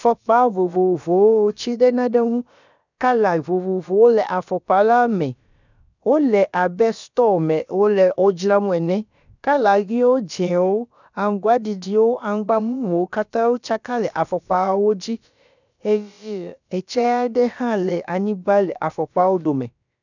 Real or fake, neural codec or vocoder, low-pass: fake; codec, 16 kHz, about 1 kbps, DyCAST, with the encoder's durations; 7.2 kHz